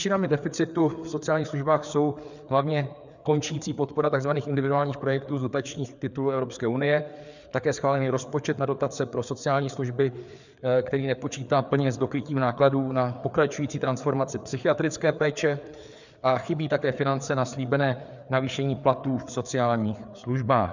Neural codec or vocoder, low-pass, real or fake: codec, 16 kHz, 4 kbps, FreqCodec, larger model; 7.2 kHz; fake